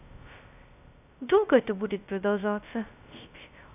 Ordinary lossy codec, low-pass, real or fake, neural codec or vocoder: none; 3.6 kHz; fake; codec, 16 kHz, 0.2 kbps, FocalCodec